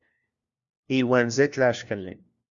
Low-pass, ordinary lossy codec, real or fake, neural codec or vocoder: 7.2 kHz; Opus, 64 kbps; fake; codec, 16 kHz, 1 kbps, FunCodec, trained on LibriTTS, 50 frames a second